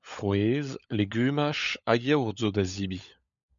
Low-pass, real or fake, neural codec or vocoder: 7.2 kHz; fake; codec, 16 kHz, 16 kbps, FunCodec, trained on LibriTTS, 50 frames a second